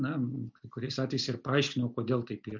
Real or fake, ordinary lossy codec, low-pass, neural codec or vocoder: real; MP3, 64 kbps; 7.2 kHz; none